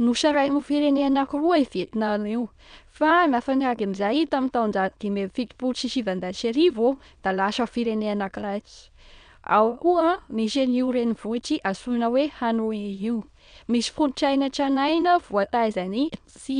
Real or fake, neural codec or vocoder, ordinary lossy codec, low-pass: fake; autoencoder, 22.05 kHz, a latent of 192 numbers a frame, VITS, trained on many speakers; none; 9.9 kHz